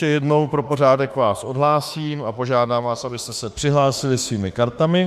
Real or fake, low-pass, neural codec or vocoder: fake; 14.4 kHz; autoencoder, 48 kHz, 32 numbers a frame, DAC-VAE, trained on Japanese speech